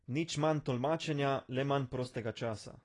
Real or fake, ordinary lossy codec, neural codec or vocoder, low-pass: real; AAC, 32 kbps; none; 10.8 kHz